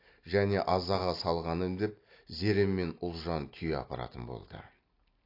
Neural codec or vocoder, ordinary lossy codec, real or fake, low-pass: none; AAC, 32 kbps; real; 5.4 kHz